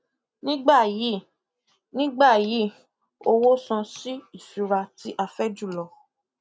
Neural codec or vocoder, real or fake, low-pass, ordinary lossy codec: none; real; none; none